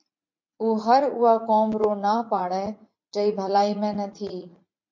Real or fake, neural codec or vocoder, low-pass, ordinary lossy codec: fake; vocoder, 44.1 kHz, 80 mel bands, Vocos; 7.2 kHz; MP3, 48 kbps